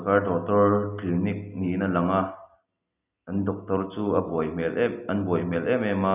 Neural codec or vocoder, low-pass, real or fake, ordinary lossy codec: none; 3.6 kHz; real; none